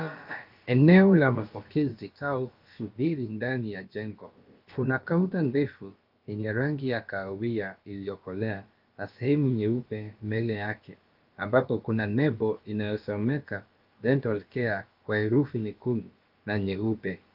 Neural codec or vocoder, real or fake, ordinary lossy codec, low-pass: codec, 16 kHz, about 1 kbps, DyCAST, with the encoder's durations; fake; Opus, 32 kbps; 5.4 kHz